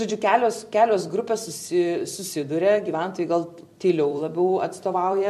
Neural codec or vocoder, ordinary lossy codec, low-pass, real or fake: vocoder, 44.1 kHz, 128 mel bands every 256 samples, BigVGAN v2; MP3, 64 kbps; 14.4 kHz; fake